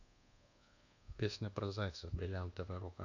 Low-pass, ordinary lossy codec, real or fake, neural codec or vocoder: 7.2 kHz; none; fake; codec, 24 kHz, 1.2 kbps, DualCodec